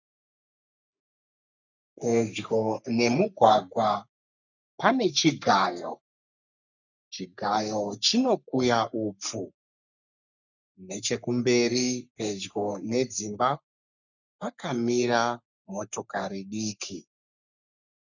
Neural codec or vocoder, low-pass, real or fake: codec, 44.1 kHz, 3.4 kbps, Pupu-Codec; 7.2 kHz; fake